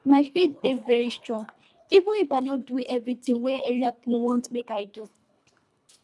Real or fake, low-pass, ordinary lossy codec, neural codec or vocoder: fake; none; none; codec, 24 kHz, 1.5 kbps, HILCodec